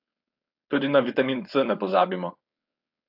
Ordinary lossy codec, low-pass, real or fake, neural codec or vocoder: AAC, 48 kbps; 5.4 kHz; fake; codec, 16 kHz, 4.8 kbps, FACodec